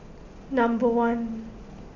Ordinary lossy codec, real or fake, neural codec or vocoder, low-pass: none; real; none; 7.2 kHz